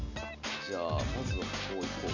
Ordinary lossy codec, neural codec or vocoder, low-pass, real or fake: none; none; 7.2 kHz; real